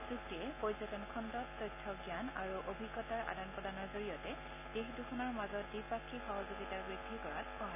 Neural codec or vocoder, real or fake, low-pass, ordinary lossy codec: none; real; 3.6 kHz; none